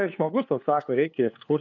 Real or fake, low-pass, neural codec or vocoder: fake; 7.2 kHz; codec, 16 kHz, 2 kbps, FunCodec, trained on LibriTTS, 25 frames a second